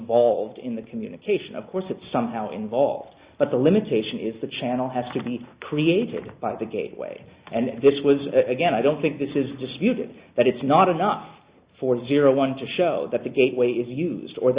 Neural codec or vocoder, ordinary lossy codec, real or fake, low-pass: none; Opus, 64 kbps; real; 3.6 kHz